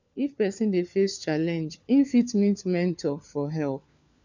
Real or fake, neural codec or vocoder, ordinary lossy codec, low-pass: fake; codec, 16 kHz, 4 kbps, FunCodec, trained on LibriTTS, 50 frames a second; none; 7.2 kHz